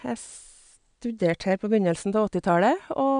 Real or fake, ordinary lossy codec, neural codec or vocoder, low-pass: real; none; none; 9.9 kHz